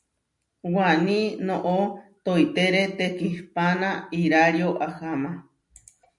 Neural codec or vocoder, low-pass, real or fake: none; 10.8 kHz; real